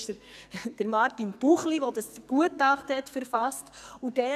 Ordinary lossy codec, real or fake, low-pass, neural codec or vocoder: none; fake; 14.4 kHz; codec, 44.1 kHz, 2.6 kbps, SNAC